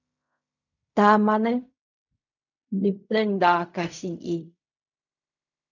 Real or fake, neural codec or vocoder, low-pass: fake; codec, 16 kHz in and 24 kHz out, 0.4 kbps, LongCat-Audio-Codec, fine tuned four codebook decoder; 7.2 kHz